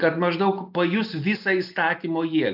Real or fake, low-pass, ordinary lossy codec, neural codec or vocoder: real; 5.4 kHz; AAC, 48 kbps; none